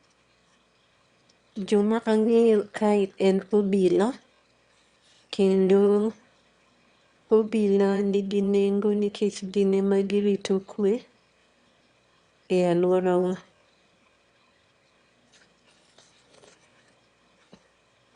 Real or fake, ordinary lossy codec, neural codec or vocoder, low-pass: fake; Opus, 64 kbps; autoencoder, 22.05 kHz, a latent of 192 numbers a frame, VITS, trained on one speaker; 9.9 kHz